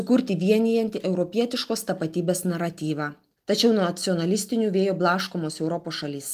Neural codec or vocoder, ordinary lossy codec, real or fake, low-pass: none; Opus, 32 kbps; real; 14.4 kHz